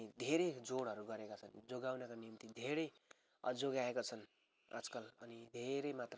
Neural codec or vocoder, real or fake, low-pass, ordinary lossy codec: none; real; none; none